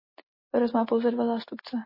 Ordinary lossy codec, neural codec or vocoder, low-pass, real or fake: MP3, 24 kbps; none; 5.4 kHz; real